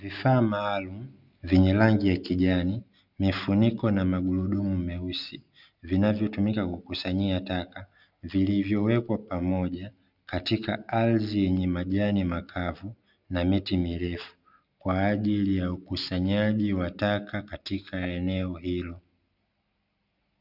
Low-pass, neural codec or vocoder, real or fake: 5.4 kHz; none; real